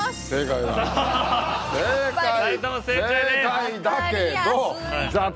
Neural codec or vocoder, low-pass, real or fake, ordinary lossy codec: none; none; real; none